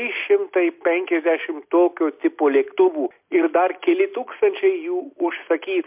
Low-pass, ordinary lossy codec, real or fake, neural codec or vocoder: 3.6 kHz; MP3, 32 kbps; real; none